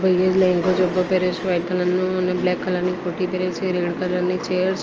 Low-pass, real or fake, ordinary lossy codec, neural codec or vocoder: 7.2 kHz; real; Opus, 24 kbps; none